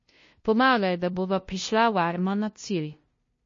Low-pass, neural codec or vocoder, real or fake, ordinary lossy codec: 7.2 kHz; codec, 16 kHz, 0.5 kbps, FunCodec, trained on LibriTTS, 25 frames a second; fake; MP3, 32 kbps